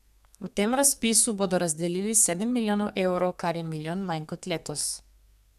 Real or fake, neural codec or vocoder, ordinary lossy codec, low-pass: fake; codec, 32 kHz, 1.9 kbps, SNAC; none; 14.4 kHz